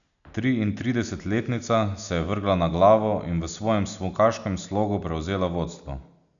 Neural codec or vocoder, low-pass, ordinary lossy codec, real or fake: none; 7.2 kHz; none; real